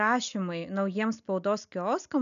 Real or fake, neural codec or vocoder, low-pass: real; none; 7.2 kHz